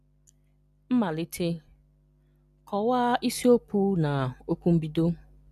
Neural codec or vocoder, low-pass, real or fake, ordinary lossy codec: none; 14.4 kHz; real; none